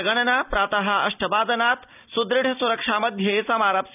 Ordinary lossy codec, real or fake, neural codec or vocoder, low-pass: none; real; none; 3.6 kHz